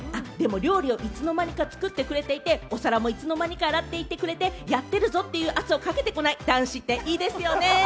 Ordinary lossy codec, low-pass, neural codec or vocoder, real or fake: none; none; none; real